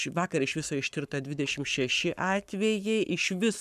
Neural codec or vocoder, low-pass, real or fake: codec, 44.1 kHz, 7.8 kbps, Pupu-Codec; 14.4 kHz; fake